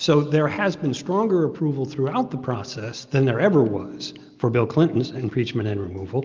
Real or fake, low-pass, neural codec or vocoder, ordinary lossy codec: real; 7.2 kHz; none; Opus, 24 kbps